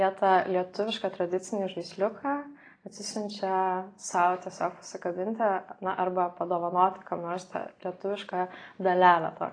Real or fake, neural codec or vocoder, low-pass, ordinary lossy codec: real; none; 9.9 kHz; AAC, 32 kbps